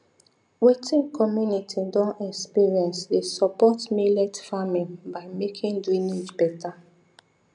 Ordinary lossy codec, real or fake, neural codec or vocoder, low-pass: none; real; none; 9.9 kHz